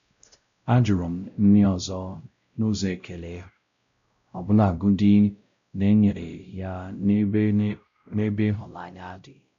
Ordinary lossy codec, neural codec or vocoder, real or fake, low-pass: none; codec, 16 kHz, 0.5 kbps, X-Codec, WavLM features, trained on Multilingual LibriSpeech; fake; 7.2 kHz